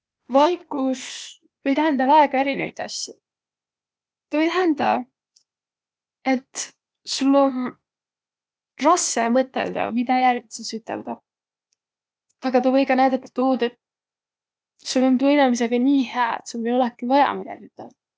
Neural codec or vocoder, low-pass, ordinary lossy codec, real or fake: codec, 16 kHz, 0.8 kbps, ZipCodec; none; none; fake